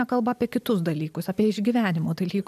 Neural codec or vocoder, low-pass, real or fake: none; 14.4 kHz; real